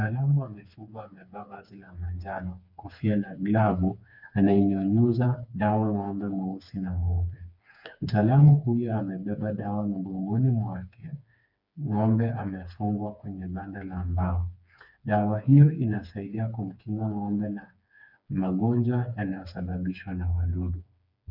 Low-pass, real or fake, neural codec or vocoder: 5.4 kHz; fake; codec, 16 kHz, 4 kbps, FreqCodec, smaller model